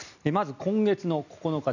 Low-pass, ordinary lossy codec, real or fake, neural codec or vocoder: 7.2 kHz; none; real; none